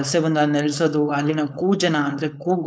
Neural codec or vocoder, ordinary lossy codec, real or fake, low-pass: codec, 16 kHz, 4.8 kbps, FACodec; none; fake; none